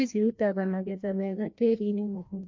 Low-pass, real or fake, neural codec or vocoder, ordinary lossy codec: 7.2 kHz; fake; codec, 16 kHz, 1 kbps, FreqCodec, larger model; MP3, 48 kbps